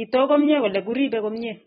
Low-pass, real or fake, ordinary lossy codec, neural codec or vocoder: 7.2 kHz; real; AAC, 16 kbps; none